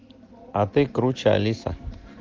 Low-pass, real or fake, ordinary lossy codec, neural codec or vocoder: 7.2 kHz; real; Opus, 16 kbps; none